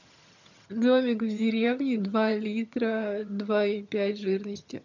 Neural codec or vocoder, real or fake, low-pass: vocoder, 22.05 kHz, 80 mel bands, HiFi-GAN; fake; 7.2 kHz